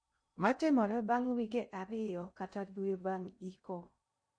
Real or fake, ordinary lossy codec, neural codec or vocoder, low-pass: fake; MP3, 48 kbps; codec, 16 kHz in and 24 kHz out, 0.6 kbps, FocalCodec, streaming, 2048 codes; 9.9 kHz